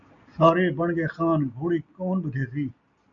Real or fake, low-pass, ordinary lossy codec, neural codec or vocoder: real; 7.2 kHz; MP3, 96 kbps; none